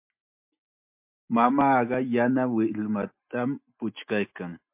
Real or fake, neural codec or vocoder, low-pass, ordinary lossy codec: real; none; 3.6 kHz; MP3, 32 kbps